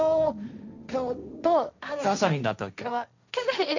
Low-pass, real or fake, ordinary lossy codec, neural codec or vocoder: 7.2 kHz; fake; none; codec, 16 kHz, 1.1 kbps, Voila-Tokenizer